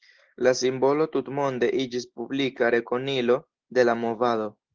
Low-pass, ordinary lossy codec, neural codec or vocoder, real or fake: 7.2 kHz; Opus, 16 kbps; none; real